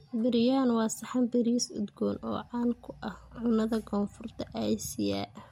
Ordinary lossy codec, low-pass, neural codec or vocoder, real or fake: MP3, 64 kbps; 19.8 kHz; none; real